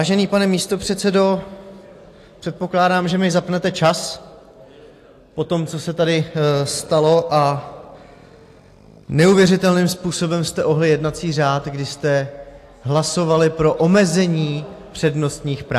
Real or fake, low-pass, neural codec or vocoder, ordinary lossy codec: real; 14.4 kHz; none; AAC, 64 kbps